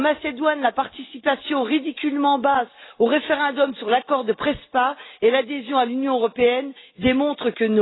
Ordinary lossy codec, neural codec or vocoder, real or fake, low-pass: AAC, 16 kbps; none; real; 7.2 kHz